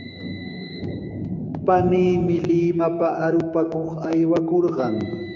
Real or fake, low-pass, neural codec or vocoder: fake; 7.2 kHz; codec, 16 kHz, 6 kbps, DAC